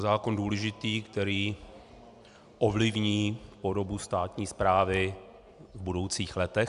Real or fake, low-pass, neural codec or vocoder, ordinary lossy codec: real; 10.8 kHz; none; AAC, 96 kbps